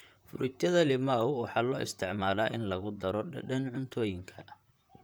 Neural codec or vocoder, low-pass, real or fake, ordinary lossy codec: vocoder, 44.1 kHz, 128 mel bands, Pupu-Vocoder; none; fake; none